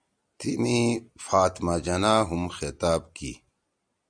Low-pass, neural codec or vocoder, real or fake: 9.9 kHz; none; real